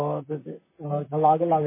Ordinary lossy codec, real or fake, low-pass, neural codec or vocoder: MP3, 16 kbps; fake; 3.6 kHz; vocoder, 44.1 kHz, 128 mel bands, Pupu-Vocoder